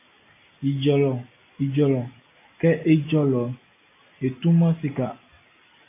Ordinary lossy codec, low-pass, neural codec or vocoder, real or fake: AAC, 24 kbps; 3.6 kHz; none; real